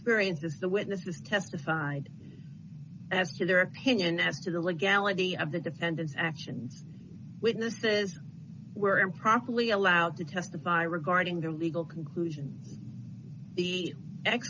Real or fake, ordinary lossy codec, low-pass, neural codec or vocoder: real; MP3, 48 kbps; 7.2 kHz; none